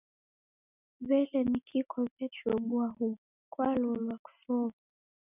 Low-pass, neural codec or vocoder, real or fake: 3.6 kHz; none; real